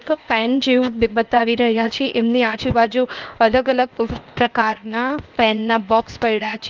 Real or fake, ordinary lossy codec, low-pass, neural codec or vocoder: fake; Opus, 24 kbps; 7.2 kHz; codec, 16 kHz, 0.8 kbps, ZipCodec